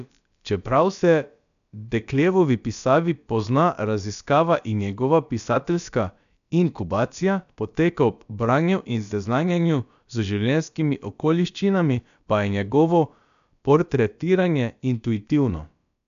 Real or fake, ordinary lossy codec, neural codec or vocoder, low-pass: fake; none; codec, 16 kHz, about 1 kbps, DyCAST, with the encoder's durations; 7.2 kHz